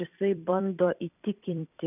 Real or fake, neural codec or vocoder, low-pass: fake; vocoder, 44.1 kHz, 128 mel bands every 256 samples, BigVGAN v2; 3.6 kHz